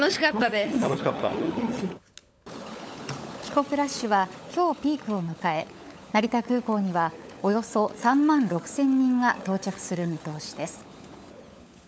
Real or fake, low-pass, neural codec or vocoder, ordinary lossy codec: fake; none; codec, 16 kHz, 16 kbps, FunCodec, trained on LibriTTS, 50 frames a second; none